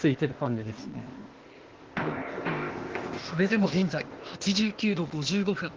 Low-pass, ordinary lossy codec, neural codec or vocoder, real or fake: 7.2 kHz; Opus, 16 kbps; codec, 16 kHz, 0.8 kbps, ZipCodec; fake